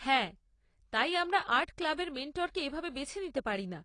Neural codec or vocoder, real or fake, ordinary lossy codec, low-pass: none; real; AAC, 32 kbps; 9.9 kHz